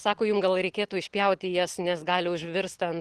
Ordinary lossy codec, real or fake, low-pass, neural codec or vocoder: Opus, 16 kbps; real; 10.8 kHz; none